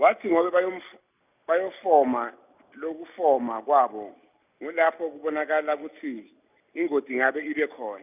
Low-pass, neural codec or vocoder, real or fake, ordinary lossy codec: 3.6 kHz; none; real; none